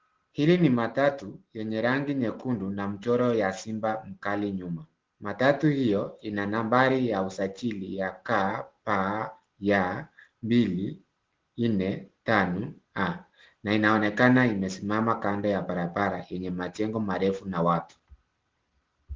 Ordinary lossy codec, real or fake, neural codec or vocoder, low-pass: Opus, 16 kbps; real; none; 7.2 kHz